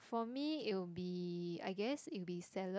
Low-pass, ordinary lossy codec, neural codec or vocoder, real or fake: none; none; none; real